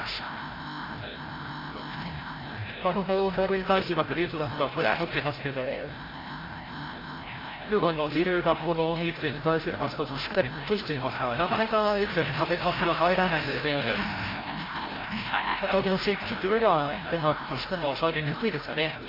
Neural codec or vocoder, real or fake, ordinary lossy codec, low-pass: codec, 16 kHz, 0.5 kbps, FreqCodec, larger model; fake; AAC, 24 kbps; 5.4 kHz